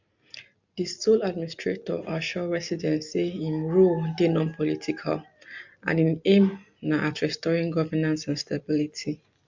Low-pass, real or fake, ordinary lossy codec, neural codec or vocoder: 7.2 kHz; real; MP3, 64 kbps; none